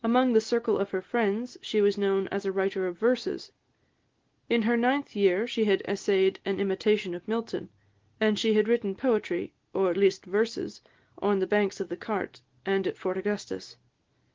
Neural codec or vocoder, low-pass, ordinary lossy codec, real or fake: none; 7.2 kHz; Opus, 16 kbps; real